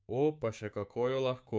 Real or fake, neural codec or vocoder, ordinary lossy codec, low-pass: fake; codec, 16 kHz, 6 kbps, DAC; none; none